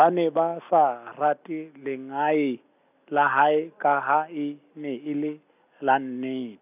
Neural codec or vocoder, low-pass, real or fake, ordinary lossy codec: none; 3.6 kHz; real; none